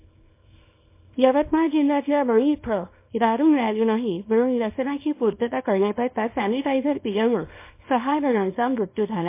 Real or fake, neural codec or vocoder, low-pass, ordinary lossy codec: fake; codec, 24 kHz, 0.9 kbps, WavTokenizer, small release; 3.6 kHz; MP3, 24 kbps